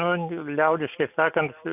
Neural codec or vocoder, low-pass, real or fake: none; 3.6 kHz; real